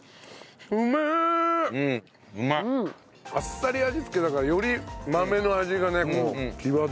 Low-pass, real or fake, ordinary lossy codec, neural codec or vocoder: none; real; none; none